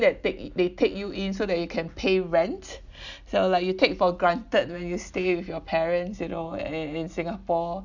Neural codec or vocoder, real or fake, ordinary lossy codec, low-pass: none; real; none; 7.2 kHz